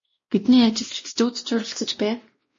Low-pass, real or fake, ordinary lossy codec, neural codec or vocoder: 7.2 kHz; fake; MP3, 32 kbps; codec, 16 kHz, 1 kbps, X-Codec, WavLM features, trained on Multilingual LibriSpeech